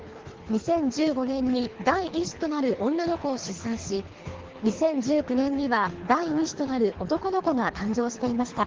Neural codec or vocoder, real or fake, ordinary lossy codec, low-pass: codec, 24 kHz, 3 kbps, HILCodec; fake; Opus, 16 kbps; 7.2 kHz